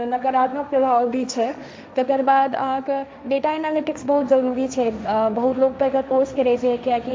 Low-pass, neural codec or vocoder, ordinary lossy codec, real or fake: none; codec, 16 kHz, 1.1 kbps, Voila-Tokenizer; none; fake